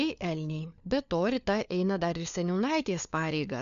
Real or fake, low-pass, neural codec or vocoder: fake; 7.2 kHz; codec, 16 kHz, 4 kbps, FunCodec, trained on LibriTTS, 50 frames a second